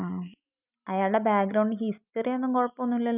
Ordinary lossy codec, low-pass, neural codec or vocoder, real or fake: none; 3.6 kHz; none; real